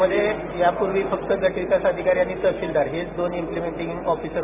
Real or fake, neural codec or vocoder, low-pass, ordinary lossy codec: real; none; 3.6 kHz; none